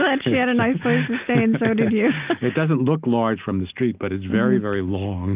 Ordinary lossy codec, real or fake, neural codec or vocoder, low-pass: Opus, 24 kbps; real; none; 3.6 kHz